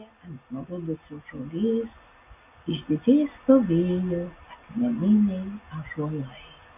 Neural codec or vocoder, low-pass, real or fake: none; 3.6 kHz; real